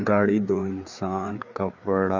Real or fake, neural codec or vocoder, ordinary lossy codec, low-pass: fake; codec, 16 kHz, 4 kbps, FreqCodec, larger model; MP3, 48 kbps; 7.2 kHz